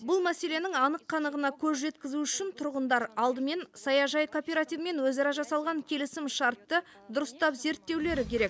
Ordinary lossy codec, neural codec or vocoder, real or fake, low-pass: none; none; real; none